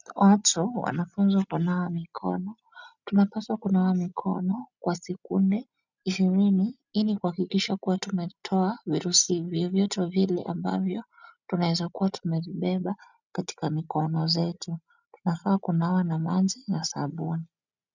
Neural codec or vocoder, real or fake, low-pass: none; real; 7.2 kHz